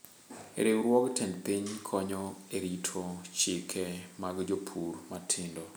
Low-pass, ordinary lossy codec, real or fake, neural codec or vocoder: none; none; real; none